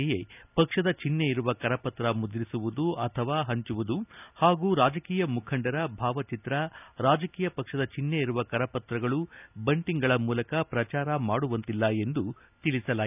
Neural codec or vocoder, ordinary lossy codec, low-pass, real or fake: none; none; 3.6 kHz; real